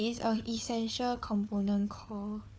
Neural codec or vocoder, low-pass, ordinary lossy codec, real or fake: codec, 16 kHz, 4 kbps, FunCodec, trained on Chinese and English, 50 frames a second; none; none; fake